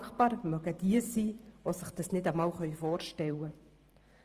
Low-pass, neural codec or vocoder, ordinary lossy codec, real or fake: 14.4 kHz; vocoder, 44.1 kHz, 128 mel bands every 512 samples, BigVGAN v2; Opus, 24 kbps; fake